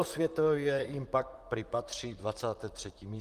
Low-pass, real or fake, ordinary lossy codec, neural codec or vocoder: 14.4 kHz; fake; Opus, 32 kbps; vocoder, 44.1 kHz, 128 mel bands, Pupu-Vocoder